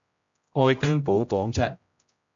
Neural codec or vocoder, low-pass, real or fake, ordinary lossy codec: codec, 16 kHz, 0.5 kbps, X-Codec, HuBERT features, trained on general audio; 7.2 kHz; fake; MP3, 48 kbps